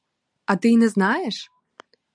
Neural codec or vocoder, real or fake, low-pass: none; real; 9.9 kHz